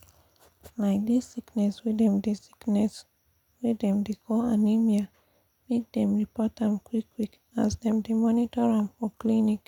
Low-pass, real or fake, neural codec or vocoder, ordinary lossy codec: 19.8 kHz; real; none; none